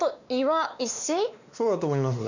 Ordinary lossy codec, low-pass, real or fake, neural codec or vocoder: none; 7.2 kHz; fake; codec, 16 kHz, 2 kbps, X-Codec, WavLM features, trained on Multilingual LibriSpeech